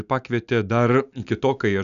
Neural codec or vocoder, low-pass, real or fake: none; 7.2 kHz; real